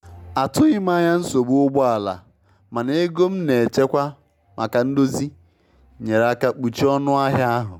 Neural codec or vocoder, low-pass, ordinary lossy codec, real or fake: none; 19.8 kHz; MP3, 96 kbps; real